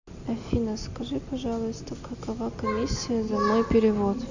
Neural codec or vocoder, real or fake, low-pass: none; real; 7.2 kHz